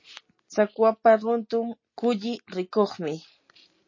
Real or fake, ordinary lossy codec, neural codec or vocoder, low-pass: real; MP3, 32 kbps; none; 7.2 kHz